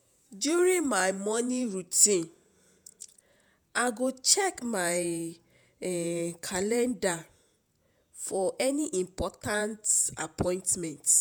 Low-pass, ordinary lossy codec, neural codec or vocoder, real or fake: none; none; vocoder, 48 kHz, 128 mel bands, Vocos; fake